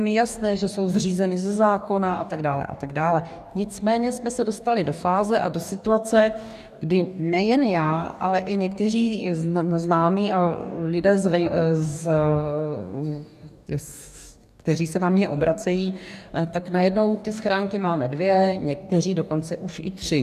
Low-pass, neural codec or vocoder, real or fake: 14.4 kHz; codec, 44.1 kHz, 2.6 kbps, DAC; fake